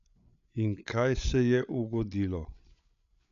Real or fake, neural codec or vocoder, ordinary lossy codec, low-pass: fake; codec, 16 kHz, 8 kbps, FreqCodec, larger model; none; 7.2 kHz